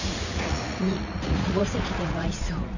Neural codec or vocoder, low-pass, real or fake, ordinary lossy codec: vocoder, 44.1 kHz, 80 mel bands, Vocos; 7.2 kHz; fake; none